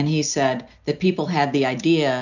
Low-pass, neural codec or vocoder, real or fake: 7.2 kHz; none; real